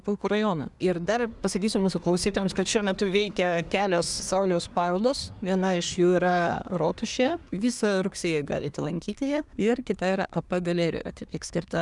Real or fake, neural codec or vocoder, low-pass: fake; codec, 24 kHz, 1 kbps, SNAC; 10.8 kHz